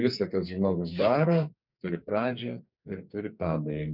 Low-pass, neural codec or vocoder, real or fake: 5.4 kHz; codec, 44.1 kHz, 2.6 kbps, SNAC; fake